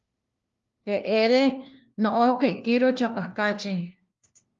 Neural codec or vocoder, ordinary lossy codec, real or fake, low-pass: codec, 16 kHz, 1 kbps, FunCodec, trained on LibriTTS, 50 frames a second; Opus, 32 kbps; fake; 7.2 kHz